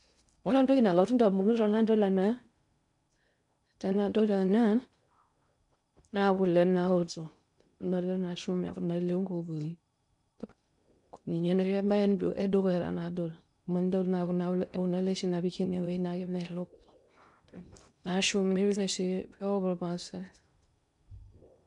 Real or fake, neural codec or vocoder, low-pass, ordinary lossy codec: fake; codec, 16 kHz in and 24 kHz out, 0.6 kbps, FocalCodec, streaming, 4096 codes; 10.8 kHz; none